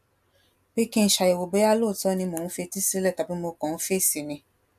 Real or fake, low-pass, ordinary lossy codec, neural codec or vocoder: real; 14.4 kHz; none; none